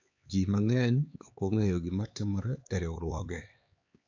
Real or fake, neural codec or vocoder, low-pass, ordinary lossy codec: fake; codec, 16 kHz, 4 kbps, X-Codec, HuBERT features, trained on LibriSpeech; 7.2 kHz; AAC, 48 kbps